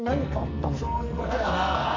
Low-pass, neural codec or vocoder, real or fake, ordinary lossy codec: 7.2 kHz; codec, 44.1 kHz, 2.6 kbps, SNAC; fake; MP3, 64 kbps